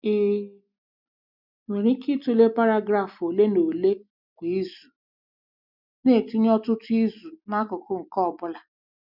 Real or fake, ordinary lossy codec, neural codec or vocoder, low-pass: real; none; none; 5.4 kHz